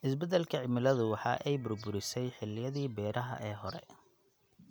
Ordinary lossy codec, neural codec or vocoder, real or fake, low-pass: none; none; real; none